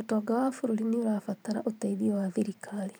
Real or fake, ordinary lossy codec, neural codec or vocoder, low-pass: fake; none; vocoder, 44.1 kHz, 128 mel bands every 512 samples, BigVGAN v2; none